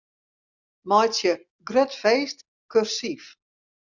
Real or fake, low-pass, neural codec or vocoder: real; 7.2 kHz; none